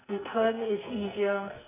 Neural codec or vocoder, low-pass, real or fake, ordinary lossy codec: codec, 44.1 kHz, 2.6 kbps, SNAC; 3.6 kHz; fake; none